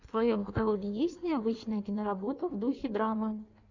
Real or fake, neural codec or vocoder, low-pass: fake; codec, 16 kHz in and 24 kHz out, 1.1 kbps, FireRedTTS-2 codec; 7.2 kHz